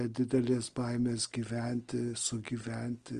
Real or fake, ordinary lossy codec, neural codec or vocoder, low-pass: real; Opus, 24 kbps; none; 9.9 kHz